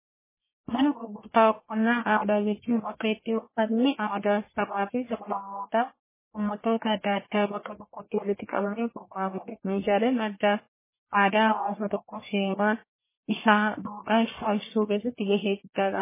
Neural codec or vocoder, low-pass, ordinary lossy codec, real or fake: codec, 44.1 kHz, 1.7 kbps, Pupu-Codec; 3.6 kHz; MP3, 16 kbps; fake